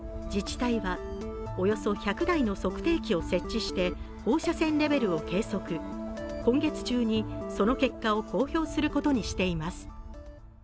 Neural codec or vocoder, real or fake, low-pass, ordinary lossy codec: none; real; none; none